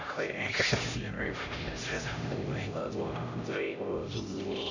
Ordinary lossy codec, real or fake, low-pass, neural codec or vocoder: none; fake; 7.2 kHz; codec, 16 kHz, 0.5 kbps, X-Codec, HuBERT features, trained on LibriSpeech